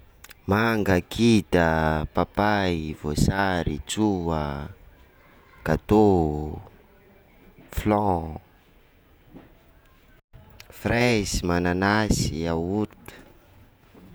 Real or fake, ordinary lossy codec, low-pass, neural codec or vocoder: fake; none; none; vocoder, 48 kHz, 128 mel bands, Vocos